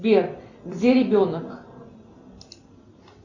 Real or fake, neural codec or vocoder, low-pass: real; none; 7.2 kHz